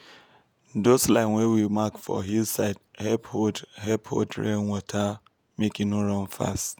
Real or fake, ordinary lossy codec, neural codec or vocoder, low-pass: fake; none; vocoder, 48 kHz, 128 mel bands, Vocos; none